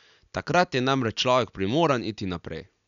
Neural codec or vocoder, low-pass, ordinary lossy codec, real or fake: none; 7.2 kHz; none; real